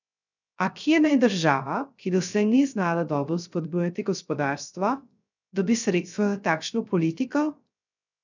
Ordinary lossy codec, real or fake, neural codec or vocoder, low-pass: none; fake; codec, 16 kHz, 0.3 kbps, FocalCodec; 7.2 kHz